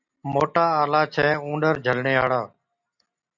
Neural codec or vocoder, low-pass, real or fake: none; 7.2 kHz; real